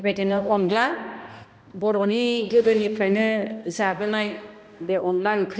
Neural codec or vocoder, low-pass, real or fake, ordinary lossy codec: codec, 16 kHz, 1 kbps, X-Codec, HuBERT features, trained on balanced general audio; none; fake; none